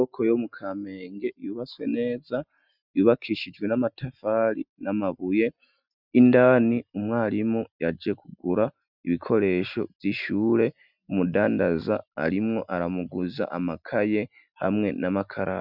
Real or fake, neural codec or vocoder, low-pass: real; none; 5.4 kHz